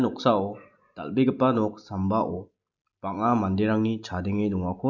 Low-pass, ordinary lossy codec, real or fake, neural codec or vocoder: 7.2 kHz; none; real; none